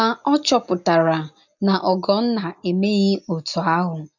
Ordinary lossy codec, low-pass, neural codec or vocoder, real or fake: none; 7.2 kHz; none; real